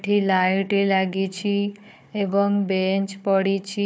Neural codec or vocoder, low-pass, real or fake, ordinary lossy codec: codec, 16 kHz, 4 kbps, FunCodec, trained on Chinese and English, 50 frames a second; none; fake; none